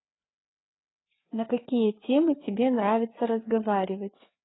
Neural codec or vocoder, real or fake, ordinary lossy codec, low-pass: codec, 16 kHz, 4 kbps, FreqCodec, larger model; fake; AAC, 16 kbps; 7.2 kHz